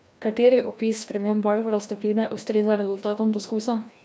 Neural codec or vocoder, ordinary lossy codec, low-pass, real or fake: codec, 16 kHz, 1 kbps, FreqCodec, larger model; none; none; fake